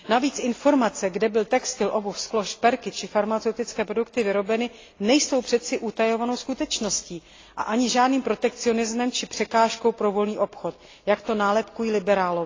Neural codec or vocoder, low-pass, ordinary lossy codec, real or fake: none; 7.2 kHz; AAC, 32 kbps; real